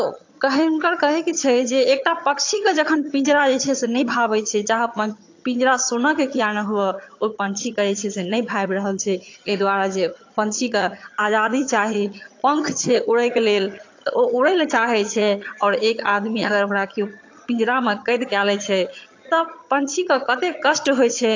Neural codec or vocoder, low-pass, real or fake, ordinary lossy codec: vocoder, 22.05 kHz, 80 mel bands, HiFi-GAN; 7.2 kHz; fake; AAC, 48 kbps